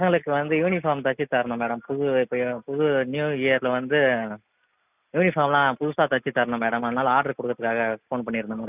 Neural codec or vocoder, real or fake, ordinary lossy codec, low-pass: none; real; none; 3.6 kHz